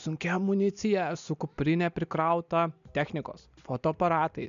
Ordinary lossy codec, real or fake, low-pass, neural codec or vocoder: MP3, 64 kbps; real; 7.2 kHz; none